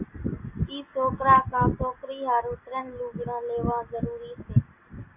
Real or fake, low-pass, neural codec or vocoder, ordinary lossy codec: real; 3.6 kHz; none; AAC, 32 kbps